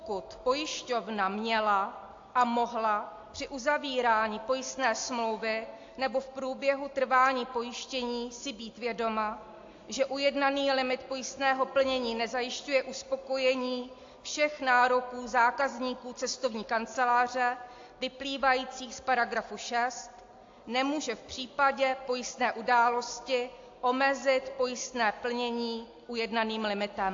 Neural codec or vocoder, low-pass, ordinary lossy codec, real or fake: none; 7.2 kHz; AAC, 48 kbps; real